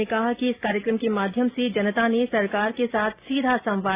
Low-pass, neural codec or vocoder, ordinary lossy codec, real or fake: 3.6 kHz; none; Opus, 64 kbps; real